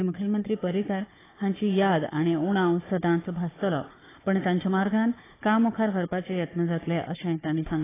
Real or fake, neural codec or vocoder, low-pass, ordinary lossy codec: fake; codec, 16 kHz, 4 kbps, FunCodec, trained on Chinese and English, 50 frames a second; 3.6 kHz; AAC, 16 kbps